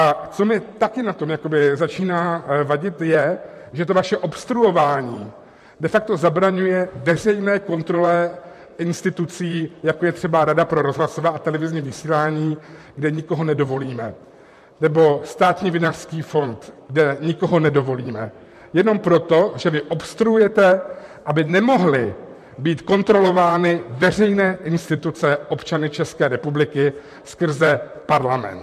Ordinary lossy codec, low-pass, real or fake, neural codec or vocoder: MP3, 64 kbps; 14.4 kHz; fake; vocoder, 44.1 kHz, 128 mel bands, Pupu-Vocoder